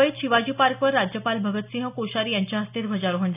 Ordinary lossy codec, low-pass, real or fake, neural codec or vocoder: none; 3.6 kHz; real; none